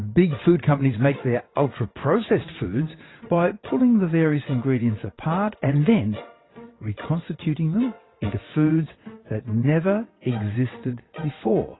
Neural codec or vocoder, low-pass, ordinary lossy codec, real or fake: vocoder, 22.05 kHz, 80 mel bands, WaveNeXt; 7.2 kHz; AAC, 16 kbps; fake